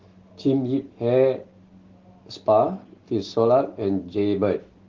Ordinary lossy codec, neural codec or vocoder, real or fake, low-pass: Opus, 16 kbps; none; real; 7.2 kHz